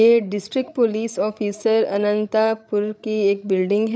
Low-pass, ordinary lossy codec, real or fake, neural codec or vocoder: none; none; fake; codec, 16 kHz, 16 kbps, FreqCodec, larger model